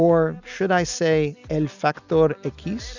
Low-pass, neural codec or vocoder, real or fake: 7.2 kHz; none; real